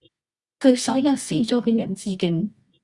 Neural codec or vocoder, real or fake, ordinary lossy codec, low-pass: codec, 24 kHz, 0.9 kbps, WavTokenizer, medium music audio release; fake; Opus, 64 kbps; 10.8 kHz